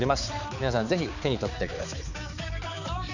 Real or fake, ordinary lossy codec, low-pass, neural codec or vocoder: fake; none; 7.2 kHz; codec, 16 kHz, 4 kbps, X-Codec, HuBERT features, trained on balanced general audio